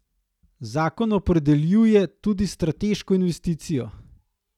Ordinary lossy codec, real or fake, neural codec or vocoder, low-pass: none; real; none; 19.8 kHz